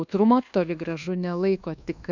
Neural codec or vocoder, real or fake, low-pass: codec, 24 kHz, 1.2 kbps, DualCodec; fake; 7.2 kHz